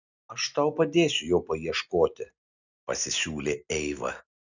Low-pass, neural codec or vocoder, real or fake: 7.2 kHz; none; real